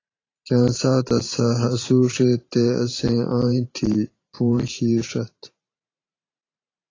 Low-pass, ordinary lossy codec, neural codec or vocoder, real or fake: 7.2 kHz; AAC, 32 kbps; vocoder, 44.1 kHz, 128 mel bands every 512 samples, BigVGAN v2; fake